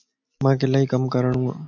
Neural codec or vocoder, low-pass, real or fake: none; 7.2 kHz; real